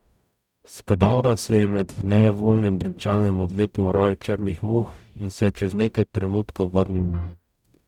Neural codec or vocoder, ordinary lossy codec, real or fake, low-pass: codec, 44.1 kHz, 0.9 kbps, DAC; none; fake; 19.8 kHz